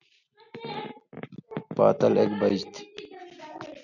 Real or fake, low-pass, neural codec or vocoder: real; 7.2 kHz; none